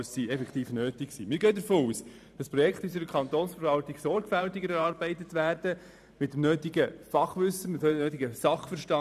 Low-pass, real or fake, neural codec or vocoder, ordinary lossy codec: 14.4 kHz; fake; vocoder, 44.1 kHz, 128 mel bands every 512 samples, BigVGAN v2; none